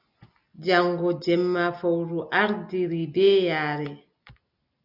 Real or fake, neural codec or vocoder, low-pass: real; none; 5.4 kHz